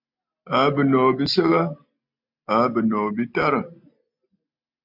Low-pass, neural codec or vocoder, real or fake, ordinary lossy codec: 5.4 kHz; none; real; MP3, 48 kbps